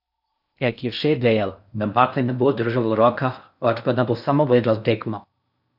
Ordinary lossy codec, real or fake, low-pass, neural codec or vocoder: none; fake; 5.4 kHz; codec, 16 kHz in and 24 kHz out, 0.6 kbps, FocalCodec, streaming, 4096 codes